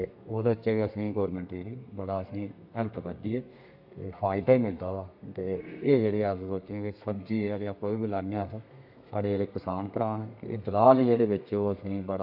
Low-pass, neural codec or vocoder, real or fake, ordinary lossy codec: 5.4 kHz; codec, 32 kHz, 1.9 kbps, SNAC; fake; AAC, 48 kbps